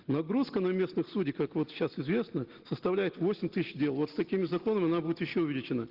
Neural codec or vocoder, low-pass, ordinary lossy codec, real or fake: none; 5.4 kHz; Opus, 16 kbps; real